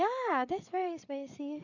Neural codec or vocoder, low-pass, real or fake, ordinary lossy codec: codec, 16 kHz, 8 kbps, FreqCodec, larger model; 7.2 kHz; fake; none